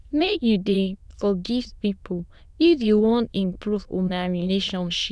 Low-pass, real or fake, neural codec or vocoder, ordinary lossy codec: none; fake; autoencoder, 22.05 kHz, a latent of 192 numbers a frame, VITS, trained on many speakers; none